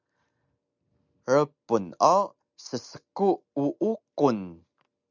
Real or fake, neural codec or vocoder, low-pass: real; none; 7.2 kHz